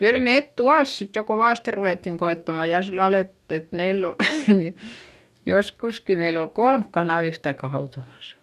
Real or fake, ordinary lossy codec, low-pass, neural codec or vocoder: fake; none; 14.4 kHz; codec, 44.1 kHz, 2.6 kbps, DAC